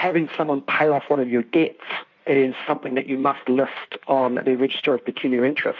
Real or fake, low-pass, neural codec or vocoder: fake; 7.2 kHz; codec, 16 kHz in and 24 kHz out, 1.1 kbps, FireRedTTS-2 codec